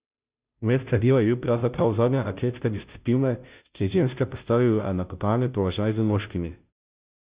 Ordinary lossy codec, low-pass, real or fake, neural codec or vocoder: Opus, 64 kbps; 3.6 kHz; fake; codec, 16 kHz, 0.5 kbps, FunCodec, trained on Chinese and English, 25 frames a second